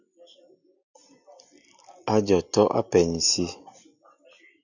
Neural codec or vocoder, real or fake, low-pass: none; real; 7.2 kHz